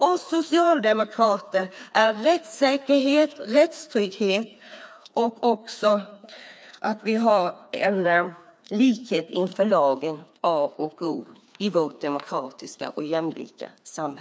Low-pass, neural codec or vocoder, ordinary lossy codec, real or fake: none; codec, 16 kHz, 2 kbps, FreqCodec, larger model; none; fake